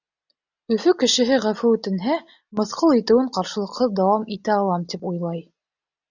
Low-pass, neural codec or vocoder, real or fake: 7.2 kHz; none; real